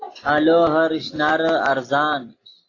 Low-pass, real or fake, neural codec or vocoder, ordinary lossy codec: 7.2 kHz; real; none; AAC, 32 kbps